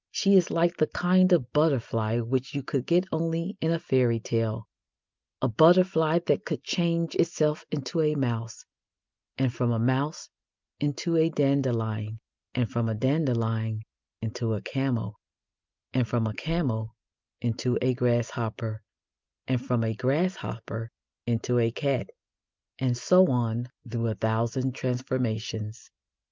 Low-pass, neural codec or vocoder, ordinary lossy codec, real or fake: 7.2 kHz; none; Opus, 24 kbps; real